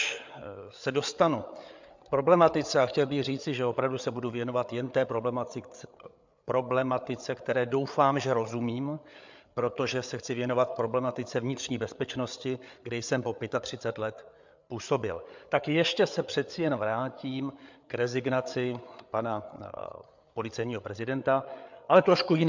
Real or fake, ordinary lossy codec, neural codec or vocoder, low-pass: fake; MP3, 64 kbps; codec, 16 kHz, 8 kbps, FreqCodec, larger model; 7.2 kHz